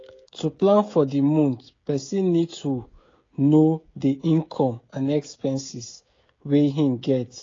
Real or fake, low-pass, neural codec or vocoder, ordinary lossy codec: fake; 7.2 kHz; codec, 16 kHz, 16 kbps, FreqCodec, smaller model; AAC, 32 kbps